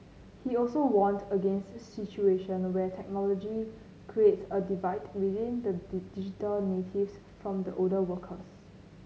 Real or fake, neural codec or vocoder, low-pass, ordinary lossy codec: real; none; none; none